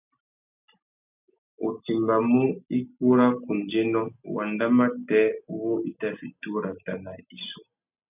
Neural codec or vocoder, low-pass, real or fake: none; 3.6 kHz; real